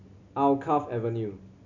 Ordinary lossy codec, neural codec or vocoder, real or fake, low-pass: none; none; real; 7.2 kHz